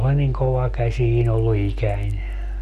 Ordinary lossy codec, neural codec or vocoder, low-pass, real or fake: none; none; 14.4 kHz; real